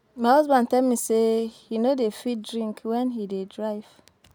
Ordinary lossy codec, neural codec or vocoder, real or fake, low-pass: none; none; real; 19.8 kHz